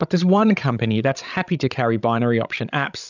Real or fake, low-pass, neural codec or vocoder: fake; 7.2 kHz; codec, 16 kHz, 16 kbps, FreqCodec, larger model